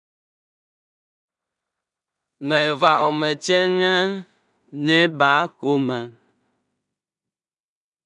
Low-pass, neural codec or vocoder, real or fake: 10.8 kHz; codec, 16 kHz in and 24 kHz out, 0.4 kbps, LongCat-Audio-Codec, two codebook decoder; fake